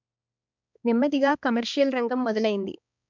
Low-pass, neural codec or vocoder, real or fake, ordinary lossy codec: 7.2 kHz; codec, 16 kHz, 2 kbps, X-Codec, HuBERT features, trained on balanced general audio; fake; MP3, 64 kbps